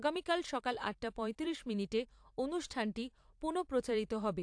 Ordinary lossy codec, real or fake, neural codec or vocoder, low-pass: AAC, 64 kbps; fake; vocoder, 44.1 kHz, 128 mel bands every 512 samples, BigVGAN v2; 9.9 kHz